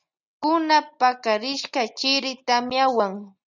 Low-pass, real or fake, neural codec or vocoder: 7.2 kHz; real; none